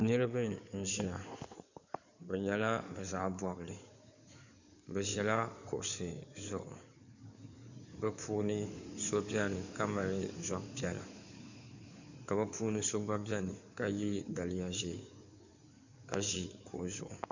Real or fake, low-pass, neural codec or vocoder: fake; 7.2 kHz; codec, 16 kHz in and 24 kHz out, 2.2 kbps, FireRedTTS-2 codec